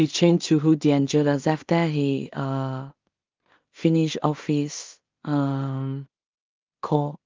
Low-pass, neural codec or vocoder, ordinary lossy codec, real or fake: 7.2 kHz; codec, 16 kHz in and 24 kHz out, 0.4 kbps, LongCat-Audio-Codec, two codebook decoder; Opus, 24 kbps; fake